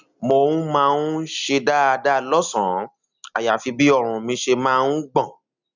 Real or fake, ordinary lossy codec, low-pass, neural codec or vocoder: real; none; 7.2 kHz; none